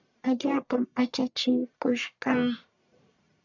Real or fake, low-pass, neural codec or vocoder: fake; 7.2 kHz; codec, 44.1 kHz, 1.7 kbps, Pupu-Codec